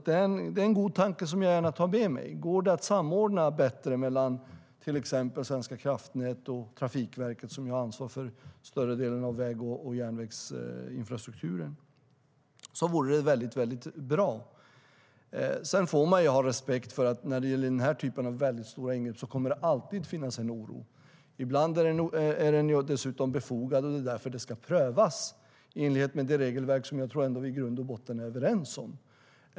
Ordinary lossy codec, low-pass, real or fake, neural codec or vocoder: none; none; real; none